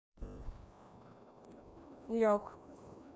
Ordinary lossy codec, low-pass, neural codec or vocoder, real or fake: none; none; codec, 16 kHz, 1 kbps, FreqCodec, larger model; fake